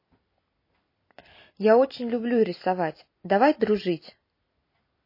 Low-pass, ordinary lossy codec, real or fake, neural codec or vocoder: 5.4 kHz; MP3, 24 kbps; fake; vocoder, 44.1 kHz, 128 mel bands every 256 samples, BigVGAN v2